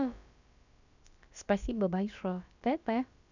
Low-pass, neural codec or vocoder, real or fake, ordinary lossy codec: 7.2 kHz; codec, 16 kHz, about 1 kbps, DyCAST, with the encoder's durations; fake; none